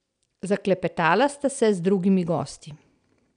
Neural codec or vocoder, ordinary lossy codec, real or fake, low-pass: none; none; real; 9.9 kHz